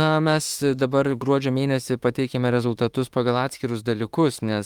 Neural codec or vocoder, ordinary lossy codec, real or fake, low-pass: autoencoder, 48 kHz, 32 numbers a frame, DAC-VAE, trained on Japanese speech; Opus, 32 kbps; fake; 19.8 kHz